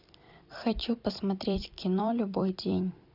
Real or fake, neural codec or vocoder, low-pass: fake; vocoder, 44.1 kHz, 128 mel bands every 256 samples, BigVGAN v2; 5.4 kHz